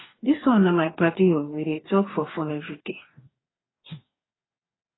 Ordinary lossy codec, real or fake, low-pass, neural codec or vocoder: AAC, 16 kbps; fake; 7.2 kHz; codec, 44.1 kHz, 2.6 kbps, DAC